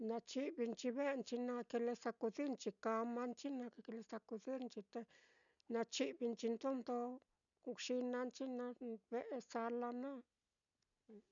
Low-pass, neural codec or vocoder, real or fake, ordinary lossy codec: 7.2 kHz; none; real; none